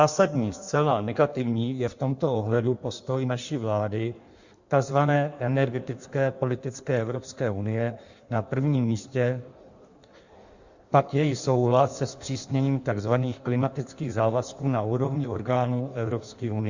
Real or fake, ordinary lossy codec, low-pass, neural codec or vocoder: fake; Opus, 64 kbps; 7.2 kHz; codec, 16 kHz in and 24 kHz out, 1.1 kbps, FireRedTTS-2 codec